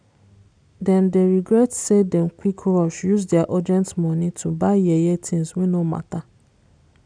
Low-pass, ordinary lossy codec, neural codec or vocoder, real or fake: 9.9 kHz; none; none; real